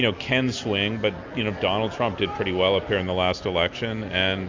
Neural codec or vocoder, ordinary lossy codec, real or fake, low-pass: none; MP3, 64 kbps; real; 7.2 kHz